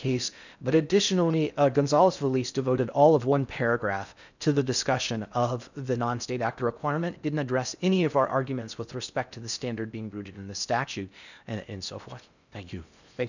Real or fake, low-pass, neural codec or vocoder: fake; 7.2 kHz; codec, 16 kHz in and 24 kHz out, 0.6 kbps, FocalCodec, streaming, 4096 codes